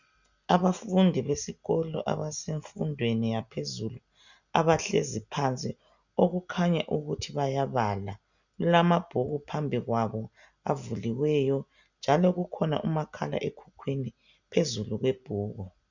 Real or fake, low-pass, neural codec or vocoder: real; 7.2 kHz; none